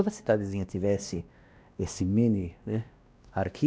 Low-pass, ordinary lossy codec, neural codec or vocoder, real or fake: none; none; codec, 16 kHz, 1 kbps, X-Codec, WavLM features, trained on Multilingual LibriSpeech; fake